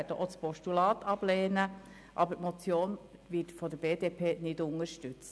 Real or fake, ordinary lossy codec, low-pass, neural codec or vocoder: real; none; none; none